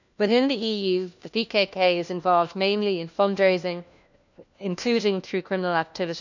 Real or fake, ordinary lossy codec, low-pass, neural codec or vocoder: fake; none; 7.2 kHz; codec, 16 kHz, 1 kbps, FunCodec, trained on LibriTTS, 50 frames a second